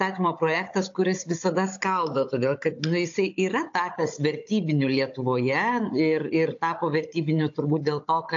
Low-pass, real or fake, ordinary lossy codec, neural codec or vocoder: 7.2 kHz; fake; AAC, 48 kbps; codec, 16 kHz, 16 kbps, FunCodec, trained on Chinese and English, 50 frames a second